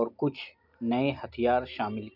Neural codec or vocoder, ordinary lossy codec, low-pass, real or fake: none; none; 5.4 kHz; real